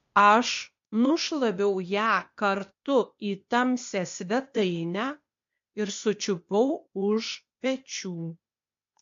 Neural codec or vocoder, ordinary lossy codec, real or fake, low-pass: codec, 16 kHz, 0.8 kbps, ZipCodec; MP3, 48 kbps; fake; 7.2 kHz